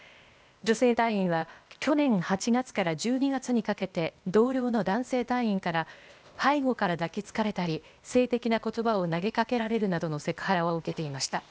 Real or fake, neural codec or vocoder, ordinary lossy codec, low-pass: fake; codec, 16 kHz, 0.8 kbps, ZipCodec; none; none